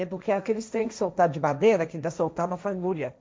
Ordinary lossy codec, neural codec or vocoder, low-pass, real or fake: none; codec, 16 kHz, 1.1 kbps, Voila-Tokenizer; none; fake